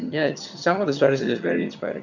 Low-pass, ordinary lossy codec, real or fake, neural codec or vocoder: 7.2 kHz; none; fake; vocoder, 22.05 kHz, 80 mel bands, HiFi-GAN